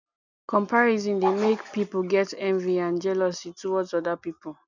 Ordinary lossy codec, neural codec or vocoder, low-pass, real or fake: none; none; 7.2 kHz; real